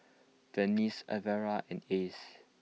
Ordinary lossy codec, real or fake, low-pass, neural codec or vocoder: none; real; none; none